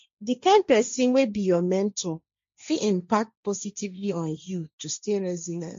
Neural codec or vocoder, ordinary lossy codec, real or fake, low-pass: codec, 16 kHz, 1.1 kbps, Voila-Tokenizer; MP3, 48 kbps; fake; 7.2 kHz